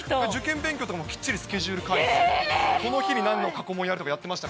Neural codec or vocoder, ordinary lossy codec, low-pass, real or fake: none; none; none; real